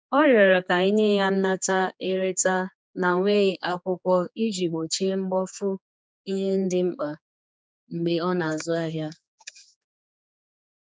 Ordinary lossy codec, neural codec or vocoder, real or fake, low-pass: none; codec, 16 kHz, 4 kbps, X-Codec, HuBERT features, trained on general audio; fake; none